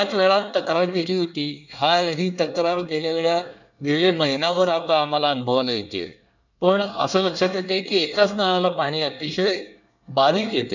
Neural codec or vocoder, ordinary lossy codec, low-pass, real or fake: codec, 24 kHz, 1 kbps, SNAC; none; 7.2 kHz; fake